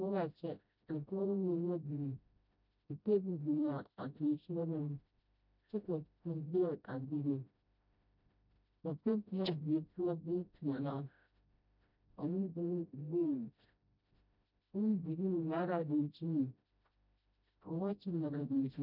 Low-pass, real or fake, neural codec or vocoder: 5.4 kHz; fake; codec, 16 kHz, 0.5 kbps, FreqCodec, smaller model